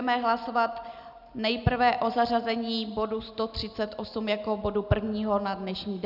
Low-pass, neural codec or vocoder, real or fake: 5.4 kHz; none; real